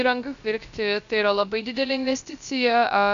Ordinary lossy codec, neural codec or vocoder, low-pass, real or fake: AAC, 96 kbps; codec, 16 kHz, 0.3 kbps, FocalCodec; 7.2 kHz; fake